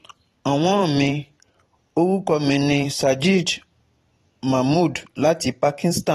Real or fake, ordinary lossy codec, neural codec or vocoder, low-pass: fake; AAC, 32 kbps; vocoder, 44.1 kHz, 128 mel bands every 512 samples, BigVGAN v2; 19.8 kHz